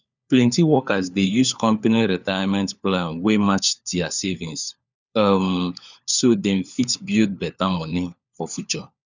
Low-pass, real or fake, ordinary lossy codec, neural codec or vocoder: 7.2 kHz; fake; none; codec, 16 kHz, 4 kbps, FunCodec, trained on LibriTTS, 50 frames a second